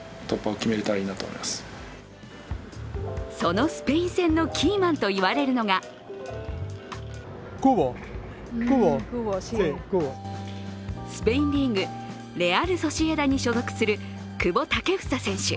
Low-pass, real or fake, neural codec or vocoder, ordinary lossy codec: none; real; none; none